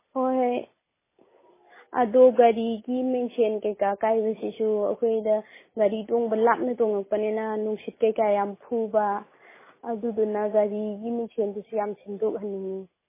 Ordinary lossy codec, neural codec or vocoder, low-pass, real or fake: MP3, 16 kbps; none; 3.6 kHz; real